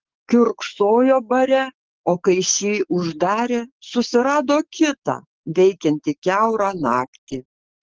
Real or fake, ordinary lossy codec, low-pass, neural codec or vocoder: fake; Opus, 16 kbps; 7.2 kHz; codec, 44.1 kHz, 7.8 kbps, DAC